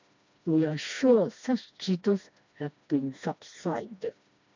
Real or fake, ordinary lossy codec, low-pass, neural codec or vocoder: fake; MP3, 64 kbps; 7.2 kHz; codec, 16 kHz, 1 kbps, FreqCodec, smaller model